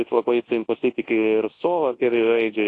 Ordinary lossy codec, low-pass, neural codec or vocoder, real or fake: AAC, 32 kbps; 10.8 kHz; codec, 24 kHz, 0.9 kbps, WavTokenizer, large speech release; fake